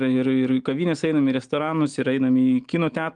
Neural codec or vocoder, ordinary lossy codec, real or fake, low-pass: none; Opus, 24 kbps; real; 9.9 kHz